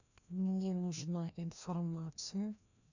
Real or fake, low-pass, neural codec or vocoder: fake; 7.2 kHz; codec, 16 kHz, 1 kbps, FreqCodec, larger model